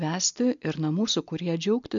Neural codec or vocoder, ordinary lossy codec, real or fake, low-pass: none; MP3, 64 kbps; real; 7.2 kHz